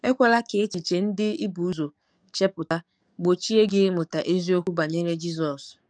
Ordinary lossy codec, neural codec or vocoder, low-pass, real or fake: none; codec, 44.1 kHz, 7.8 kbps, DAC; 9.9 kHz; fake